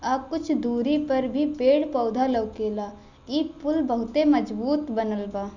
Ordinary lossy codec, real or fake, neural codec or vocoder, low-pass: none; real; none; 7.2 kHz